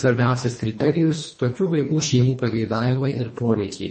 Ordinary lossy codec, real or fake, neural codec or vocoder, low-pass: MP3, 32 kbps; fake; codec, 24 kHz, 1.5 kbps, HILCodec; 10.8 kHz